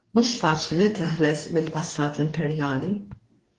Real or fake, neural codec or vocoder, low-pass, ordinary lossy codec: fake; codec, 44.1 kHz, 2.6 kbps, DAC; 10.8 kHz; Opus, 24 kbps